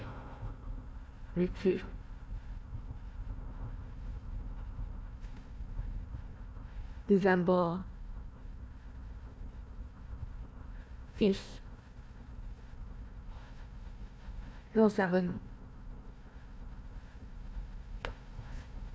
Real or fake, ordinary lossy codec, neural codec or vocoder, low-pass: fake; none; codec, 16 kHz, 1 kbps, FunCodec, trained on Chinese and English, 50 frames a second; none